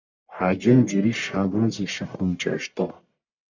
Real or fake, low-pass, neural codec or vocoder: fake; 7.2 kHz; codec, 44.1 kHz, 1.7 kbps, Pupu-Codec